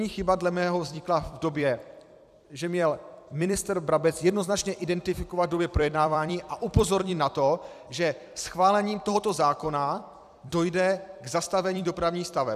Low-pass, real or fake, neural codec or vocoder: 14.4 kHz; fake; vocoder, 44.1 kHz, 128 mel bands every 256 samples, BigVGAN v2